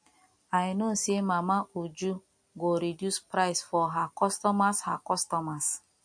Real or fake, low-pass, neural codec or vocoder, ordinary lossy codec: real; 9.9 kHz; none; MP3, 48 kbps